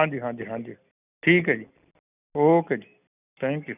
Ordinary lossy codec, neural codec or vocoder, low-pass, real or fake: none; none; 3.6 kHz; real